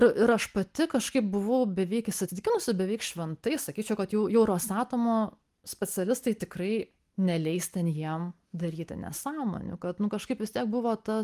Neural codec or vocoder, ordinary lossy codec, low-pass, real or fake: none; Opus, 32 kbps; 14.4 kHz; real